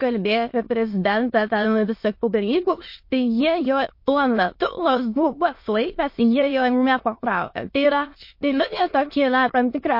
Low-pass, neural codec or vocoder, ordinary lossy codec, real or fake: 5.4 kHz; autoencoder, 22.05 kHz, a latent of 192 numbers a frame, VITS, trained on many speakers; MP3, 32 kbps; fake